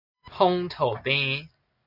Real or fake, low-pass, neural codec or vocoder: real; 5.4 kHz; none